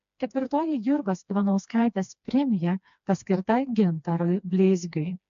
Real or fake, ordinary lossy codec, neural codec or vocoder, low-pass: fake; AAC, 64 kbps; codec, 16 kHz, 2 kbps, FreqCodec, smaller model; 7.2 kHz